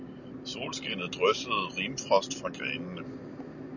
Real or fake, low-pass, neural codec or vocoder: real; 7.2 kHz; none